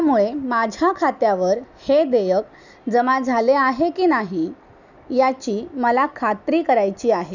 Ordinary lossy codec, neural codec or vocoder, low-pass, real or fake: none; none; 7.2 kHz; real